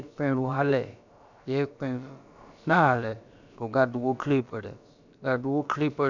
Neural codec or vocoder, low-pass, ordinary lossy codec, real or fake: codec, 16 kHz, about 1 kbps, DyCAST, with the encoder's durations; 7.2 kHz; none; fake